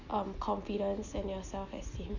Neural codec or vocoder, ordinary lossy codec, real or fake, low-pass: none; none; real; 7.2 kHz